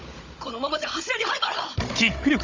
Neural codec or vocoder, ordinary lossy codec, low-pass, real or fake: codec, 16 kHz, 16 kbps, FunCodec, trained on Chinese and English, 50 frames a second; Opus, 32 kbps; 7.2 kHz; fake